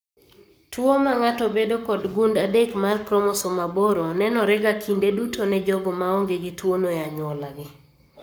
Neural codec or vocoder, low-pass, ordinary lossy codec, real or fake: codec, 44.1 kHz, 7.8 kbps, Pupu-Codec; none; none; fake